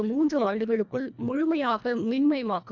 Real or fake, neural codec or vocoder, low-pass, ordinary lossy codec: fake; codec, 24 kHz, 1.5 kbps, HILCodec; 7.2 kHz; none